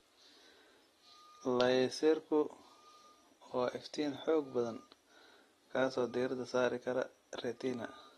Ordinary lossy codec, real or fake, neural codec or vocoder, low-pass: AAC, 32 kbps; real; none; 19.8 kHz